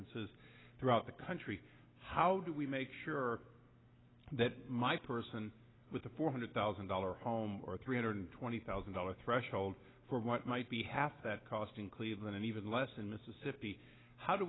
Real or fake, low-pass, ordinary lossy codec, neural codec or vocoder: real; 7.2 kHz; AAC, 16 kbps; none